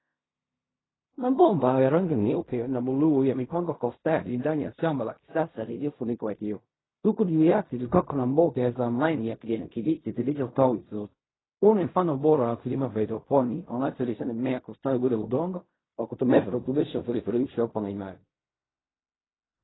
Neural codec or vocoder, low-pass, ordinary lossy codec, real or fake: codec, 16 kHz in and 24 kHz out, 0.4 kbps, LongCat-Audio-Codec, fine tuned four codebook decoder; 7.2 kHz; AAC, 16 kbps; fake